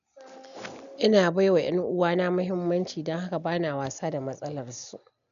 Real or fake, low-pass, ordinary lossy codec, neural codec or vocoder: real; 7.2 kHz; none; none